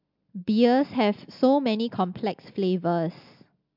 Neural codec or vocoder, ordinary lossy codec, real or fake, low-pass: none; MP3, 48 kbps; real; 5.4 kHz